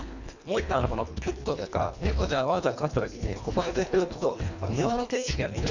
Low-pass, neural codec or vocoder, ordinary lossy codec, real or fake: 7.2 kHz; codec, 24 kHz, 1.5 kbps, HILCodec; none; fake